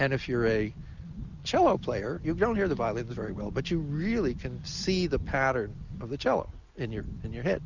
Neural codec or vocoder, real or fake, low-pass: none; real; 7.2 kHz